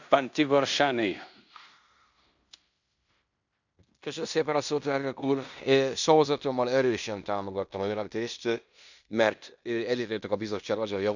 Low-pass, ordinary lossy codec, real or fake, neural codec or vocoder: 7.2 kHz; none; fake; codec, 16 kHz in and 24 kHz out, 0.9 kbps, LongCat-Audio-Codec, fine tuned four codebook decoder